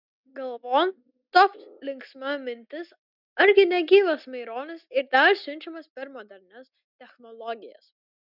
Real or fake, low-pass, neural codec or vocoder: real; 5.4 kHz; none